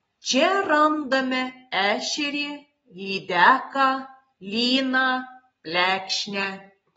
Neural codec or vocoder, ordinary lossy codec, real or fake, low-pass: none; AAC, 24 kbps; real; 19.8 kHz